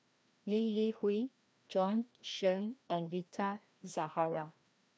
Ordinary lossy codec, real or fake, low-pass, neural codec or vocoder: none; fake; none; codec, 16 kHz, 1 kbps, FreqCodec, larger model